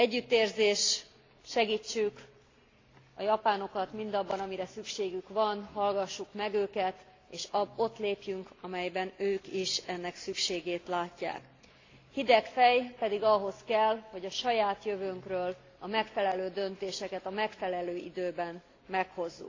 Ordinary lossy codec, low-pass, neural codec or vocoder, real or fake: AAC, 32 kbps; 7.2 kHz; none; real